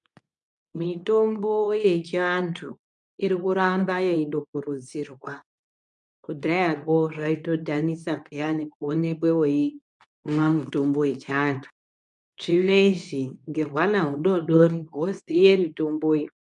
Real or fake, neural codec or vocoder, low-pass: fake; codec, 24 kHz, 0.9 kbps, WavTokenizer, medium speech release version 2; 10.8 kHz